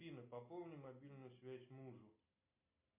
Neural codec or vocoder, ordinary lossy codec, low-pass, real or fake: none; MP3, 24 kbps; 3.6 kHz; real